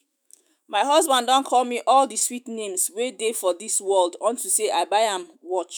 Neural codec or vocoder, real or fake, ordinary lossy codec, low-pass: autoencoder, 48 kHz, 128 numbers a frame, DAC-VAE, trained on Japanese speech; fake; none; none